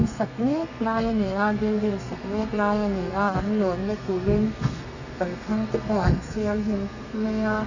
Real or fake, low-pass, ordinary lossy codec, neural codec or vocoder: fake; 7.2 kHz; none; codec, 32 kHz, 1.9 kbps, SNAC